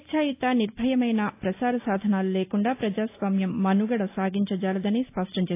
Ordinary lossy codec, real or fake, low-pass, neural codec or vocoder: AAC, 24 kbps; real; 3.6 kHz; none